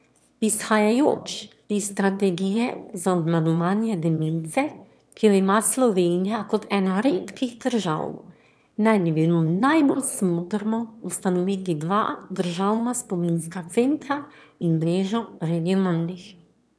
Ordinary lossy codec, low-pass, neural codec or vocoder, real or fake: none; none; autoencoder, 22.05 kHz, a latent of 192 numbers a frame, VITS, trained on one speaker; fake